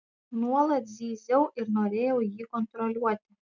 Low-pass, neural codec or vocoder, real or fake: 7.2 kHz; none; real